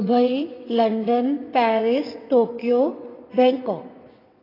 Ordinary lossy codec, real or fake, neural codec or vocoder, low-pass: AAC, 24 kbps; fake; vocoder, 22.05 kHz, 80 mel bands, WaveNeXt; 5.4 kHz